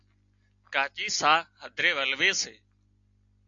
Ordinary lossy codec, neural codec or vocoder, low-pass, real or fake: AAC, 64 kbps; none; 7.2 kHz; real